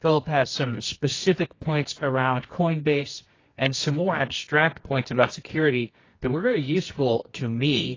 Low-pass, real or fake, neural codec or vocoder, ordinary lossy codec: 7.2 kHz; fake; codec, 24 kHz, 0.9 kbps, WavTokenizer, medium music audio release; AAC, 32 kbps